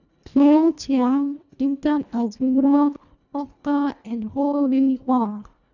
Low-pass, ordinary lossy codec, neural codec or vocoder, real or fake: 7.2 kHz; none; codec, 24 kHz, 1.5 kbps, HILCodec; fake